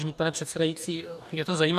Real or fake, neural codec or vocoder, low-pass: fake; codec, 44.1 kHz, 2.6 kbps, DAC; 14.4 kHz